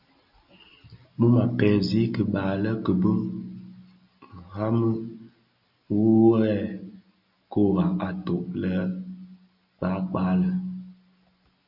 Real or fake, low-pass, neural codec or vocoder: real; 5.4 kHz; none